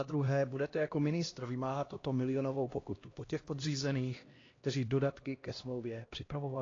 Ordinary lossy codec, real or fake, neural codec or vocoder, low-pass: AAC, 32 kbps; fake; codec, 16 kHz, 1 kbps, X-Codec, HuBERT features, trained on LibriSpeech; 7.2 kHz